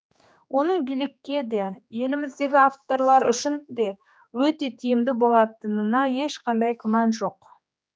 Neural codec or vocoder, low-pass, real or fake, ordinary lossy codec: codec, 16 kHz, 2 kbps, X-Codec, HuBERT features, trained on general audio; none; fake; none